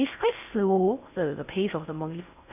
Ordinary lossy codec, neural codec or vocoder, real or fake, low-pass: none; codec, 16 kHz in and 24 kHz out, 0.6 kbps, FocalCodec, streaming, 4096 codes; fake; 3.6 kHz